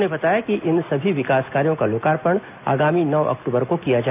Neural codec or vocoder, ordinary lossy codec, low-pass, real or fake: none; none; 3.6 kHz; real